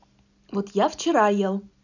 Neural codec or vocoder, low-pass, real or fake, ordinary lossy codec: none; 7.2 kHz; real; none